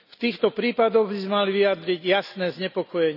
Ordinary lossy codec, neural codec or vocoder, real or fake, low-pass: none; none; real; 5.4 kHz